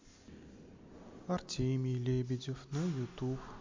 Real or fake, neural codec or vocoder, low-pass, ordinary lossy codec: real; none; 7.2 kHz; MP3, 48 kbps